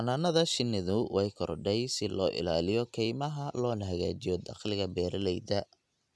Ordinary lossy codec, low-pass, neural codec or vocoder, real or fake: none; none; none; real